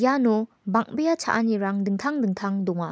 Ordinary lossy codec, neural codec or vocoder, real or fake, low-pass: none; none; real; none